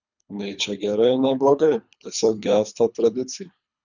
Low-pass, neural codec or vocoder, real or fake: 7.2 kHz; codec, 24 kHz, 3 kbps, HILCodec; fake